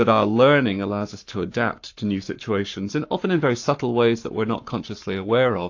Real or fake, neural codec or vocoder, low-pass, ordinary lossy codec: fake; codec, 44.1 kHz, 7.8 kbps, Pupu-Codec; 7.2 kHz; AAC, 48 kbps